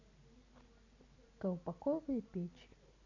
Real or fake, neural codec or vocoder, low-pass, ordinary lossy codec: real; none; 7.2 kHz; none